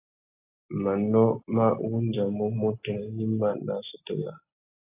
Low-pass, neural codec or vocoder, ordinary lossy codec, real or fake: 3.6 kHz; none; AAC, 24 kbps; real